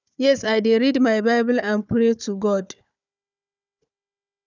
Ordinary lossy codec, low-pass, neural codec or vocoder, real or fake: none; 7.2 kHz; codec, 16 kHz, 4 kbps, FunCodec, trained on Chinese and English, 50 frames a second; fake